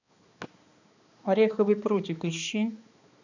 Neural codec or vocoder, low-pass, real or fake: codec, 16 kHz, 2 kbps, X-Codec, HuBERT features, trained on balanced general audio; 7.2 kHz; fake